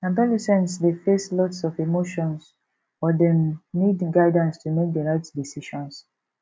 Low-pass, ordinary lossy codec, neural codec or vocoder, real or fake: none; none; none; real